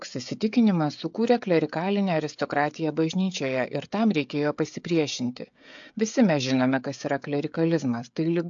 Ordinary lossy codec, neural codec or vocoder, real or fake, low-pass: AAC, 64 kbps; codec, 16 kHz, 16 kbps, FreqCodec, smaller model; fake; 7.2 kHz